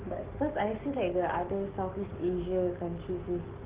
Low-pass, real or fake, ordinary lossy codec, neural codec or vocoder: 3.6 kHz; fake; Opus, 16 kbps; codec, 44.1 kHz, 7.8 kbps, DAC